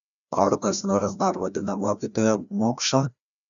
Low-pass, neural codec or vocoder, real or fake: 7.2 kHz; codec, 16 kHz, 1 kbps, FreqCodec, larger model; fake